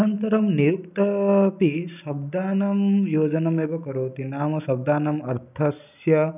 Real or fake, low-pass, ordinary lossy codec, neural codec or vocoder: real; 3.6 kHz; none; none